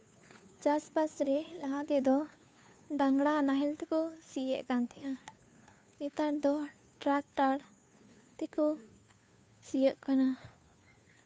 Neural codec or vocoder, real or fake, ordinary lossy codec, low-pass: codec, 16 kHz, 2 kbps, FunCodec, trained on Chinese and English, 25 frames a second; fake; none; none